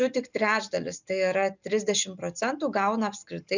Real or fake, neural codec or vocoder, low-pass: real; none; 7.2 kHz